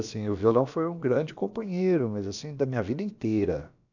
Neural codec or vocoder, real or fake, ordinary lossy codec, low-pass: codec, 16 kHz, about 1 kbps, DyCAST, with the encoder's durations; fake; none; 7.2 kHz